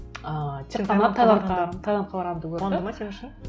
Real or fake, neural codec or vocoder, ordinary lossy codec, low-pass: real; none; none; none